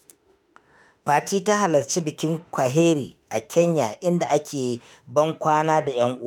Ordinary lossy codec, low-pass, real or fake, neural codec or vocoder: none; none; fake; autoencoder, 48 kHz, 32 numbers a frame, DAC-VAE, trained on Japanese speech